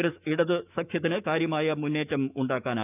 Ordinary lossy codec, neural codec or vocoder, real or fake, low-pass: none; autoencoder, 48 kHz, 128 numbers a frame, DAC-VAE, trained on Japanese speech; fake; 3.6 kHz